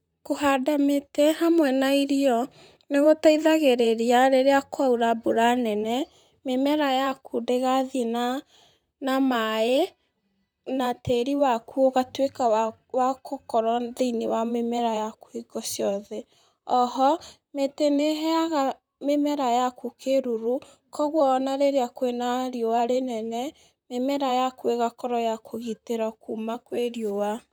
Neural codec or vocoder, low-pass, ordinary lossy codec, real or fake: vocoder, 44.1 kHz, 128 mel bands, Pupu-Vocoder; none; none; fake